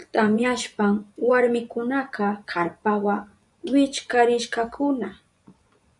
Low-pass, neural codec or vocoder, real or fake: 10.8 kHz; vocoder, 24 kHz, 100 mel bands, Vocos; fake